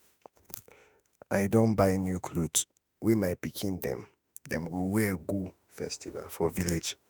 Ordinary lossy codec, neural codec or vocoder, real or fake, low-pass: none; autoencoder, 48 kHz, 32 numbers a frame, DAC-VAE, trained on Japanese speech; fake; none